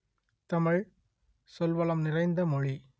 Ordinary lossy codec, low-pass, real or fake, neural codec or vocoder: none; none; real; none